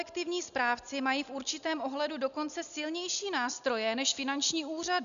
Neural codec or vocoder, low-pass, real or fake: none; 7.2 kHz; real